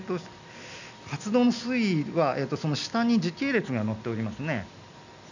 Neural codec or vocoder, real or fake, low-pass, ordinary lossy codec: none; real; 7.2 kHz; none